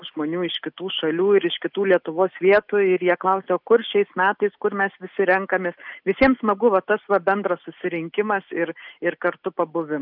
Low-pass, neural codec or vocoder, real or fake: 5.4 kHz; none; real